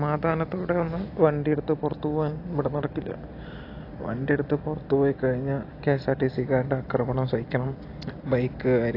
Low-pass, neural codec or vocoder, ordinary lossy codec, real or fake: 5.4 kHz; none; AAC, 32 kbps; real